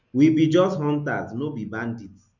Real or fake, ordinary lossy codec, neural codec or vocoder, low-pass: real; none; none; 7.2 kHz